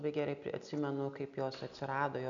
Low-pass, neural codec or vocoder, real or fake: 7.2 kHz; none; real